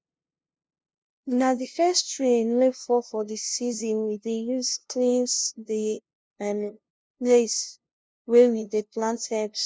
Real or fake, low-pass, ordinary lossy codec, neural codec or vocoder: fake; none; none; codec, 16 kHz, 0.5 kbps, FunCodec, trained on LibriTTS, 25 frames a second